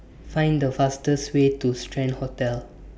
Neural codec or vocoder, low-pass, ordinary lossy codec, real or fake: none; none; none; real